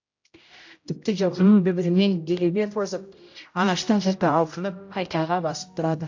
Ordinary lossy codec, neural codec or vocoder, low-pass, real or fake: AAC, 48 kbps; codec, 16 kHz, 0.5 kbps, X-Codec, HuBERT features, trained on general audio; 7.2 kHz; fake